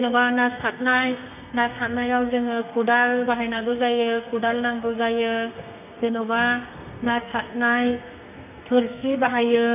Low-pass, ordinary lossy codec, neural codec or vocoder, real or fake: 3.6 kHz; none; codec, 32 kHz, 1.9 kbps, SNAC; fake